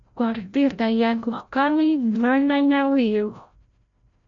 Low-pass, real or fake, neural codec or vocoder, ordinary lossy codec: 7.2 kHz; fake; codec, 16 kHz, 0.5 kbps, FreqCodec, larger model; MP3, 48 kbps